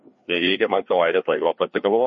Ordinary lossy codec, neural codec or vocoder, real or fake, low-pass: MP3, 32 kbps; codec, 16 kHz, 2 kbps, FreqCodec, larger model; fake; 7.2 kHz